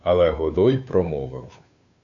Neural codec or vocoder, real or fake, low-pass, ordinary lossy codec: codec, 16 kHz, 2 kbps, FunCodec, trained on Chinese and English, 25 frames a second; fake; 7.2 kHz; MP3, 96 kbps